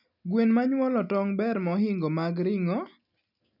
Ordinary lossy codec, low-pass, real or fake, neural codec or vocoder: none; 5.4 kHz; real; none